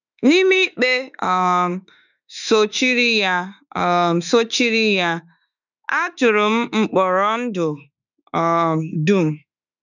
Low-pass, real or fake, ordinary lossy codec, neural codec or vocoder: 7.2 kHz; fake; none; autoencoder, 48 kHz, 32 numbers a frame, DAC-VAE, trained on Japanese speech